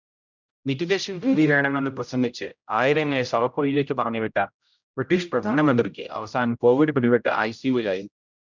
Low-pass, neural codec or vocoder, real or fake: 7.2 kHz; codec, 16 kHz, 0.5 kbps, X-Codec, HuBERT features, trained on general audio; fake